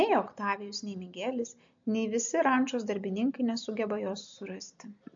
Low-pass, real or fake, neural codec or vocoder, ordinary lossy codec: 7.2 kHz; real; none; MP3, 48 kbps